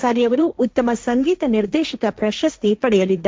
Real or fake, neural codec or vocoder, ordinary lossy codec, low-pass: fake; codec, 16 kHz, 1.1 kbps, Voila-Tokenizer; none; none